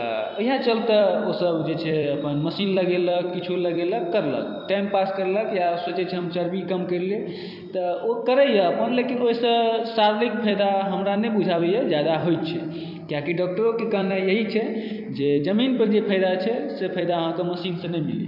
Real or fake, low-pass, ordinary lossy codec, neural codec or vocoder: fake; 5.4 kHz; none; vocoder, 44.1 kHz, 128 mel bands every 256 samples, BigVGAN v2